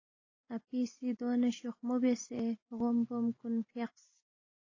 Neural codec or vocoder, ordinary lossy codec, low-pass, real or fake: none; AAC, 32 kbps; 7.2 kHz; real